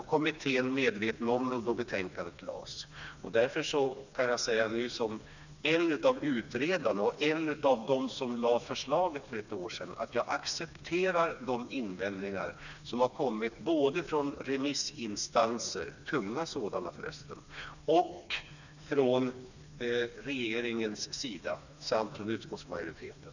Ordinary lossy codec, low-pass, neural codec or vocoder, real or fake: none; 7.2 kHz; codec, 16 kHz, 2 kbps, FreqCodec, smaller model; fake